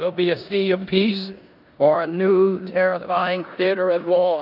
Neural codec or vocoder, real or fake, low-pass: codec, 16 kHz in and 24 kHz out, 0.9 kbps, LongCat-Audio-Codec, four codebook decoder; fake; 5.4 kHz